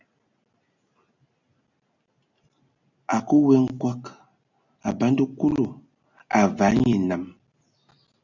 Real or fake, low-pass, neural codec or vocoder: real; 7.2 kHz; none